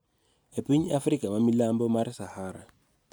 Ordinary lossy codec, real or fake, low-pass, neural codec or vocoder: none; real; none; none